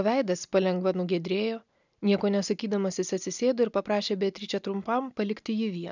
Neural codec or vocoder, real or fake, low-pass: none; real; 7.2 kHz